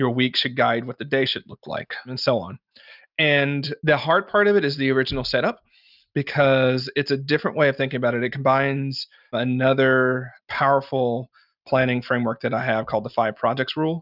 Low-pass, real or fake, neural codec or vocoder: 5.4 kHz; real; none